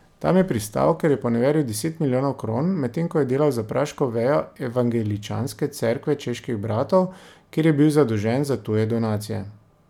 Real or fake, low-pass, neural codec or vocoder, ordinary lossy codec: real; 19.8 kHz; none; none